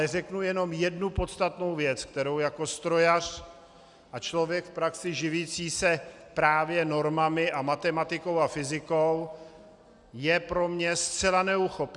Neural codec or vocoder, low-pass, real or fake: none; 10.8 kHz; real